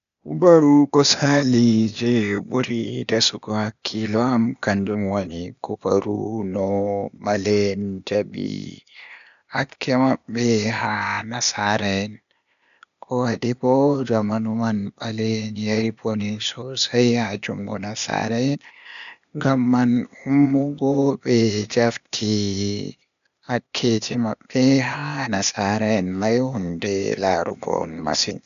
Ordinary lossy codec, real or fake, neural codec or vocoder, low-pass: none; fake; codec, 16 kHz, 0.8 kbps, ZipCodec; 7.2 kHz